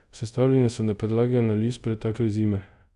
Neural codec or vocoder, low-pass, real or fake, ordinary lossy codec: codec, 24 kHz, 0.5 kbps, DualCodec; 10.8 kHz; fake; none